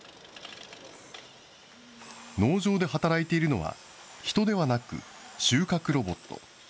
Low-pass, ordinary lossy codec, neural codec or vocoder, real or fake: none; none; none; real